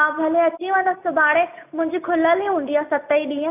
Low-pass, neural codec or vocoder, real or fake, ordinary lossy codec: 3.6 kHz; none; real; none